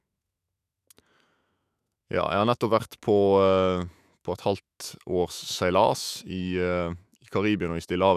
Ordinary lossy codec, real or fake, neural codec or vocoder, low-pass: none; fake; autoencoder, 48 kHz, 128 numbers a frame, DAC-VAE, trained on Japanese speech; 14.4 kHz